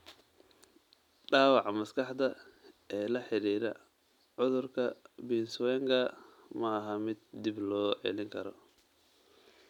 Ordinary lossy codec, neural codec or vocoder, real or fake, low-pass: none; none; real; 19.8 kHz